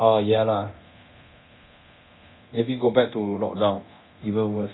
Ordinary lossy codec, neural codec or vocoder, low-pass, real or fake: AAC, 16 kbps; codec, 24 kHz, 0.9 kbps, DualCodec; 7.2 kHz; fake